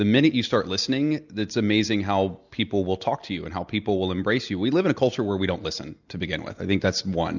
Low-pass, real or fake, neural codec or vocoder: 7.2 kHz; real; none